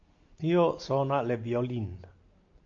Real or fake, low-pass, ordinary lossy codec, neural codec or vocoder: real; 7.2 kHz; AAC, 48 kbps; none